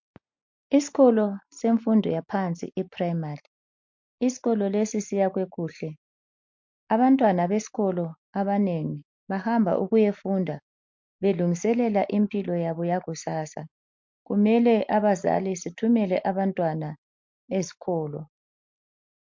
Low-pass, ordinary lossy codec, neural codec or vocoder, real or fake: 7.2 kHz; MP3, 48 kbps; none; real